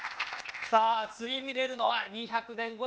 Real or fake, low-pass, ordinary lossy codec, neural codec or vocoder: fake; none; none; codec, 16 kHz, 0.8 kbps, ZipCodec